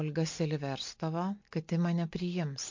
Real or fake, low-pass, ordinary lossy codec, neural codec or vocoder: real; 7.2 kHz; MP3, 48 kbps; none